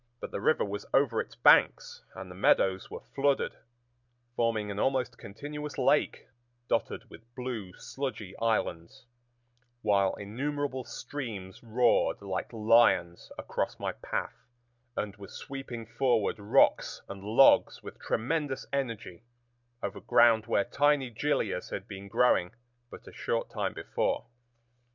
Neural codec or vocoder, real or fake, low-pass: none; real; 7.2 kHz